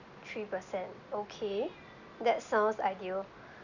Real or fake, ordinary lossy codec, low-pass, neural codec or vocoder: real; none; 7.2 kHz; none